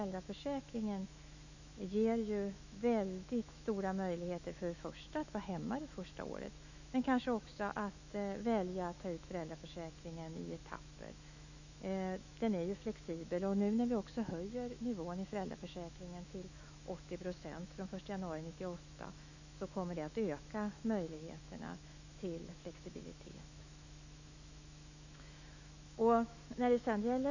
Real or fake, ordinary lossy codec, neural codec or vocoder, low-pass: fake; none; autoencoder, 48 kHz, 128 numbers a frame, DAC-VAE, trained on Japanese speech; 7.2 kHz